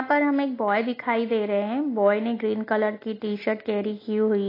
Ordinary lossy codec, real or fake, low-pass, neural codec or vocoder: AAC, 24 kbps; real; 5.4 kHz; none